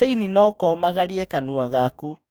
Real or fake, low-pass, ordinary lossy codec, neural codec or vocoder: fake; none; none; codec, 44.1 kHz, 2.6 kbps, DAC